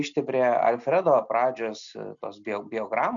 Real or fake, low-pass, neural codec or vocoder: real; 7.2 kHz; none